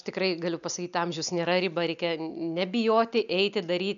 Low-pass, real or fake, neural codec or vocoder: 7.2 kHz; real; none